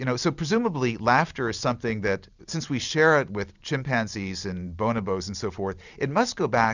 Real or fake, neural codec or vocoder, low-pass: real; none; 7.2 kHz